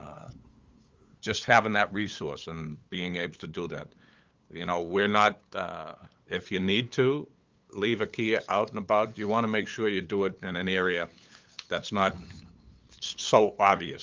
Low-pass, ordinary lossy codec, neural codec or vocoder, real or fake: 7.2 kHz; Opus, 32 kbps; codec, 16 kHz, 8 kbps, FunCodec, trained on LibriTTS, 25 frames a second; fake